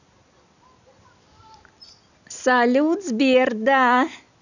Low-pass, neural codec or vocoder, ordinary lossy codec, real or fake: 7.2 kHz; none; none; real